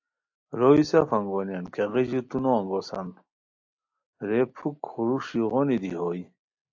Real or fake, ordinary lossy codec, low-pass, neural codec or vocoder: real; AAC, 48 kbps; 7.2 kHz; none